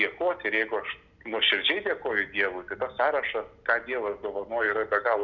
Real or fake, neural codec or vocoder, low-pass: real; none; 7.2 kHz